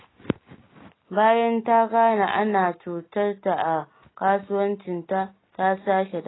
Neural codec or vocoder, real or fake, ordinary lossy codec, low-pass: none; real; AAC, 16 kbps; 7.2 kHz